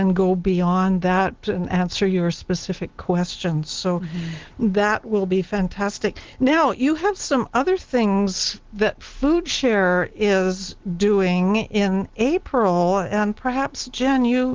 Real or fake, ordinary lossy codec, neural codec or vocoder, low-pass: real; Opus, 16 kbps; none; 7.2 kHz